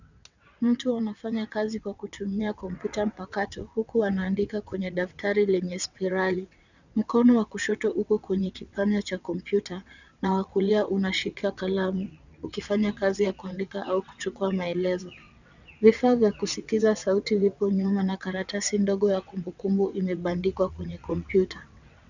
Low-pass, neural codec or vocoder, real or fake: 7.2 kHz; vocoder, 22.05 kHz, 80 mel bands, WaveNeXt; fake